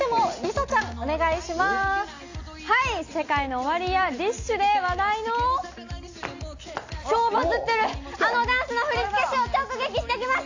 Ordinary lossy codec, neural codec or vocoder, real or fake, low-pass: AAC, 48 kbps; none; real; 7.2 kHz